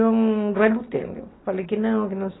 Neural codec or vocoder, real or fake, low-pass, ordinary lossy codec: none; real; 7.2 kHz; AAC, 16 kbps